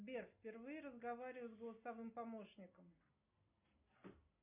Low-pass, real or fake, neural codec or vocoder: 3.6 kHz; real; none